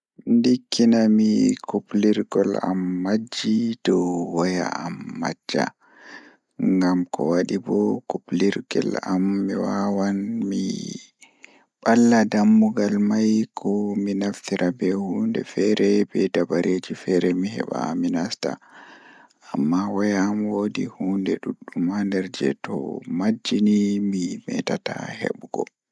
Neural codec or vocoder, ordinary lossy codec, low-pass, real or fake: none; none; none; real